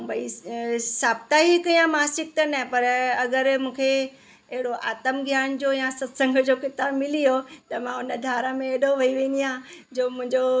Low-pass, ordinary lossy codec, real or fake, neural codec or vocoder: none; none; real; none